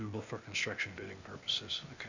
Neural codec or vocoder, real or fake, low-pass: codec, 16 kHz, 0.8 kbps, ZipCodec; fake; 7.2 kHz